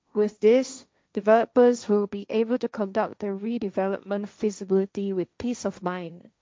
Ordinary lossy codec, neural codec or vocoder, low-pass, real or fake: none; codec, 16 kHz, 1.1 kbps, Voila-Tokenizer; none; fake